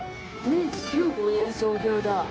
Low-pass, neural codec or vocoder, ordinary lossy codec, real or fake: none; codec, 16 kHz, 0.9 kbps, LongCat-Audio-Codec; none; fake